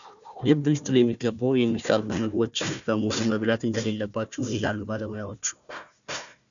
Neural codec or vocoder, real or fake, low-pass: codec, 16 kHz, 1 kbps, FunCodec, trained on Chinese and English, 50 frames a second; fake; 7.2 kHz